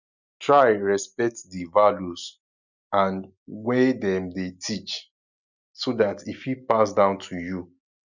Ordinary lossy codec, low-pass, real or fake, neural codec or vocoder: none; 7.2 kHz; real; none